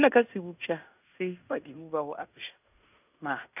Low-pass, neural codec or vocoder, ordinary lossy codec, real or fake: 3.6 kHz; codec, 16 kHz in and 24 kHz out, 0.9 kbps, LongCat-Audio-Codec, fine tuned four codebook decoder; none; fake